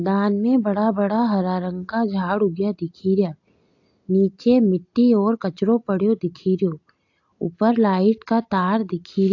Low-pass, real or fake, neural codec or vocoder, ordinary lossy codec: 7.2 kHz; real; none; AAC, 48 kbps